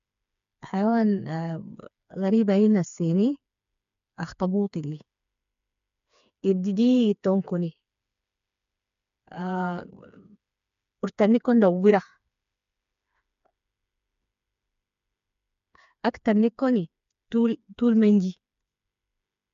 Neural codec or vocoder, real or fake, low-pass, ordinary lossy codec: codec, 16 kHz, 4 kbps, FreqCodec, smaller model; fake; 7.2 kHz; MP3, 64 kbps